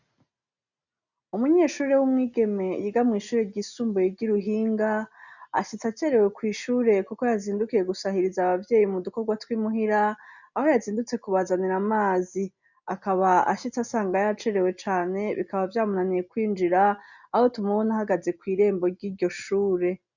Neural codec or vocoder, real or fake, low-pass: none; real; 7.2 kHz